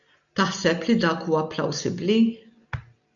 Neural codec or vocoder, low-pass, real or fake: none; 7.2 kHz; real